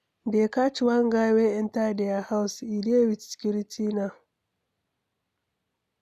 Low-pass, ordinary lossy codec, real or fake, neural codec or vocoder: 14.4 kHz; none; real; none